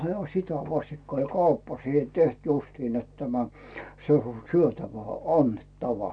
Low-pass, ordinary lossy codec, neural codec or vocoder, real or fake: 9.9 kHz; none; none; real